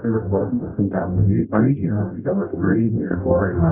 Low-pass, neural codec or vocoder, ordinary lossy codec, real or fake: 3.6 kHz; codec, 44.1 kHz, 0.9 kbps, DAC; none; fake